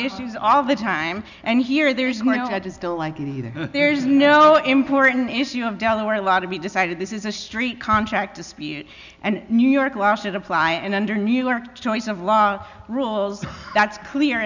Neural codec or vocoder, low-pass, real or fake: none; 7.2 kHz; real